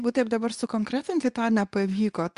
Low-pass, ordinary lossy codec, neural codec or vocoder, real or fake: 10.8 kHz; Opus, 64 kbps; codec, 24 kHz, 0.9 kbps, WavTokenizer, medium speech release version 2; fake